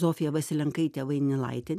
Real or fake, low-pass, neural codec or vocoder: real; 14.4 kHz; none